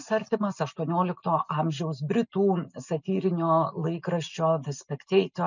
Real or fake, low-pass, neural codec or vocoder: real; 7.2 kHz; none